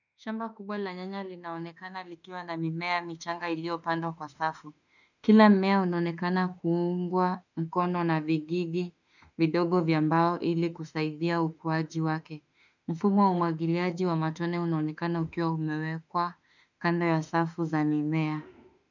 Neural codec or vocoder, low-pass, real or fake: autoencoder, 48 kHz, 32 numbers a frame, DAC-VAE, trained on Japanese speech; 7.2 kHz; fake